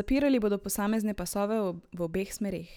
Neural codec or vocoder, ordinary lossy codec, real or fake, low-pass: none; none; real; none